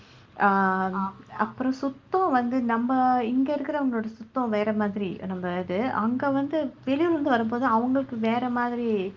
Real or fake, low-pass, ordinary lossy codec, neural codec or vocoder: real; 7.2 kHz; Opus, 32 kbps; none